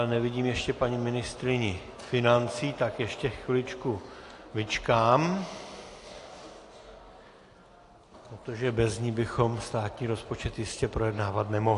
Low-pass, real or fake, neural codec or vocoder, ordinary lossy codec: 10.8 kHz; real; none; AAC, 48 kbps